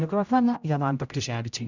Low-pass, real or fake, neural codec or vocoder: 7.2 kHz; fake; codec, 16 kHz, 0.5 kbps, X-Codec, HuBERT features, trained on general audio